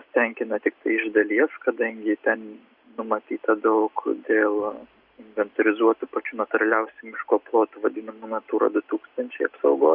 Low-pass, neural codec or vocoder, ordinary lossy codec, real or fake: 5.4 kHz; none; Opus, 64 kbps; real